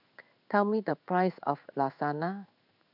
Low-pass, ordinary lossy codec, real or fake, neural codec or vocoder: 5.4 kHz; none; fake; codec, 16 kHz in and 24 kHz out, 1 kbps, XY-Tokenizer